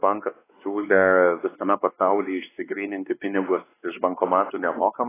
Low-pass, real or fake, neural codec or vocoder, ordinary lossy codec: 3.6 kHz; fake; codec, 16 kHz, 2 kbps, X-Codec, WavLM features, trained on Multilingual LibriSpeech; AAC, 16 kbps